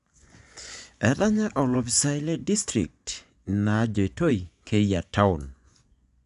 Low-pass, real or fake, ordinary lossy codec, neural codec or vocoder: 10.8 kHz; fake; none; vocoder, 24 kHz, 100 mel bands, Vocos